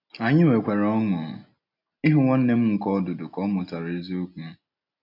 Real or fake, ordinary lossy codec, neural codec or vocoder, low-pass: real; none; none; 5.4 kHz